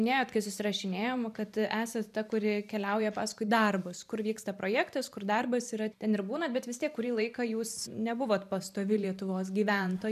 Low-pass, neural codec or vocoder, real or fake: 14.4 kHz; vocoder, 44.1 kHz, 128 mel bands every 512 samples, BigVGAN v2; fake